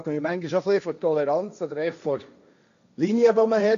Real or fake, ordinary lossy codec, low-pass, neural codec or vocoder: fake; none; 7.2 kHz; codec, 16 kHz, 1.1 kbps, Voila-Tokenizer